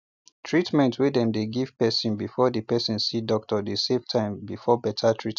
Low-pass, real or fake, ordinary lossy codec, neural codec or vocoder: 7.2 kHz; real; none; none